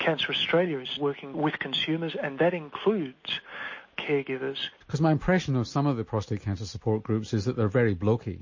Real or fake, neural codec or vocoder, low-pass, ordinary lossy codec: real; none; 7.2 kHz; MP3, 32 kbps